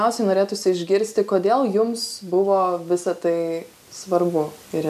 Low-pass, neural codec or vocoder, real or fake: 14.4 kHz; none; real